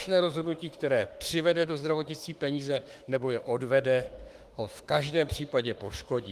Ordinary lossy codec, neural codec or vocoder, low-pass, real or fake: Opus, 24 kbps; autoencoder, 48 kHz, 32 numbers a frame, DAC-VAE, trained on Japanese speech; 14.4 kHz; fake